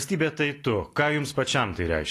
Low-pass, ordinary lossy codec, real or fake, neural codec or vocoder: 14.4 kHz; AAC, 48 kbps; real; none